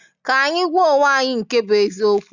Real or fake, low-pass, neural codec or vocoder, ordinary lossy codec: real; 7.2 kHz; none; none